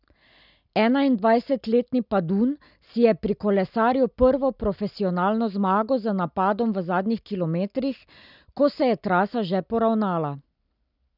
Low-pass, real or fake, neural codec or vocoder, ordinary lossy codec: 5.4 kHz; real; none; none